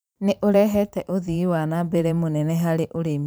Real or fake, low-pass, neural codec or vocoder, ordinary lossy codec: fake; none; vocoder, 44.1 kHz, 128 mel bands every 512 samples, BigVGAN v2; none